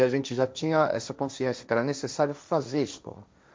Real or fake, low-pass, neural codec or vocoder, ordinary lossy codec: fake; none; codec, 16 kHz, 1.1 kbps, Voila-Tokenizer; none